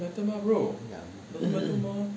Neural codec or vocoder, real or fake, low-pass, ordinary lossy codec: none; real; none; none